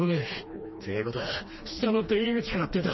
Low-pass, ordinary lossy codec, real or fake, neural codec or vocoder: 7.2 kHz; MP3, 24 kbps; fake; codec, 16 kHz, 2 kbps, FreqCodec, smaller model